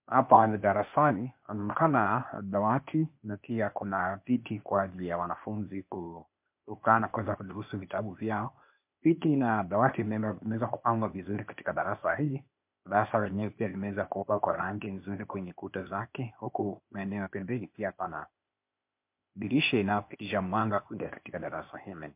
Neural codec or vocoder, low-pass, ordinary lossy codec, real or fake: codec, 16 kHz, 0.8 kbps, ZipCodec; 3.6 kHz; MP3, 32 kbps; fake